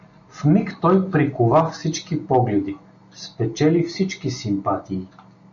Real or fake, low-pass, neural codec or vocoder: real; 7.2 kHz; none